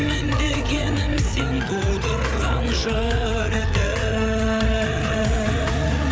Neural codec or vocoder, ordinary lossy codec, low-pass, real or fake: codec, 16 kHz, 8 kbps, FreqCodec, larger model; none; none; fake